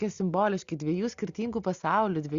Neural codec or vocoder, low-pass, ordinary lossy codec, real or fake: none; 7.2 kHz; Opus, 64 kbps; real